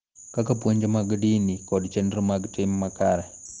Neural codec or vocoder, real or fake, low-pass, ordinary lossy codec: none; real; 7.2 kHz; Opus, 16 kbps